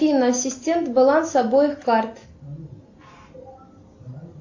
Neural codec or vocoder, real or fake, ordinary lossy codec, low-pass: none; real; AAC, 48 kbps; 7.2 kHz